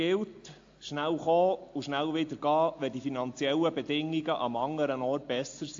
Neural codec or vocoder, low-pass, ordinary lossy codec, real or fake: none; 7.2 kHz; AAC, 48 kbps; real